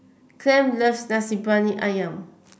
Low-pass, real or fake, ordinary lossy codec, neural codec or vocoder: none; real; none; none